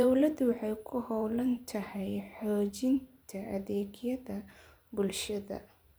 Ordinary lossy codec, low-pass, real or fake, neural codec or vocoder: none; none; fake; vocoder, 44.1 kHz, 128 mel bands every 512 samples, BigVGAN v2